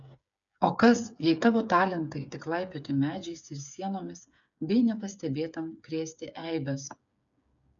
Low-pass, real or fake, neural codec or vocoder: 7.2 kHz; fake; codec, 16 kHz, 8 kbps, FreqCodec, smaller model